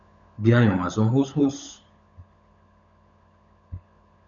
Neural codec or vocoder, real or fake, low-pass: codec, 16 kHz, 16 kbps, FunCodec, trained on LibriTTS, 50 frames a second; fake; 7.2 kHz